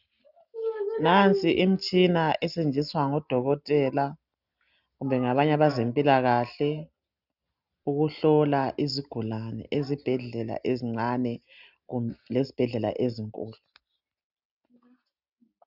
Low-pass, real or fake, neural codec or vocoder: 5.4 kHz; real; none